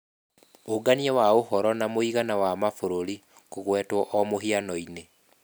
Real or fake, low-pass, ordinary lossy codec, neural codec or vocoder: real; none; none; none